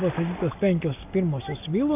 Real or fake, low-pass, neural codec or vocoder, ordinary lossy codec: real; 3.6 kHz; none; AAC, 32 kbps